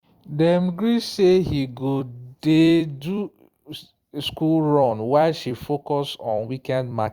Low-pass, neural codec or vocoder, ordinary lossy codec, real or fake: 19.8 kHz; vocoder, 44.1 kHz, 128 mel bands every 256 samples, BigVGAN v2; Opus, 64 kbps; fake